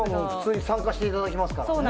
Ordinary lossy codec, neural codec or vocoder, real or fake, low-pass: none; none; real; none